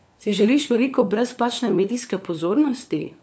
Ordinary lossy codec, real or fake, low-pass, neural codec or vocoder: none; fake; none; codec, 16 kHz, 4 kbps, FunCodec, trained on LibriTTS, 50 frames a second